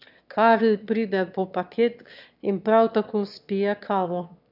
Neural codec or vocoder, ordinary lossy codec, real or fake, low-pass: autoencoder, 22.05 kHz, a latent of 192 numbers a frame, VITS, trained on one speaker; none; fake; 5.4 kHz